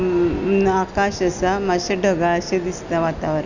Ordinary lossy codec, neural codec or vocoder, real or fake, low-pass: none; none; real; 7.2 kHz